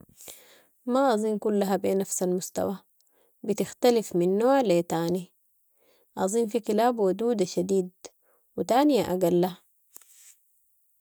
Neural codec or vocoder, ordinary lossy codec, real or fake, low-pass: none; none; real; none